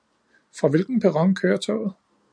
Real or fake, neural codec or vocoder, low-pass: real; none; 9.9 kHz